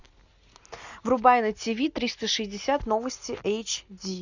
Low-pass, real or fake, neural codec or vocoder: 7.2 kHz; real; none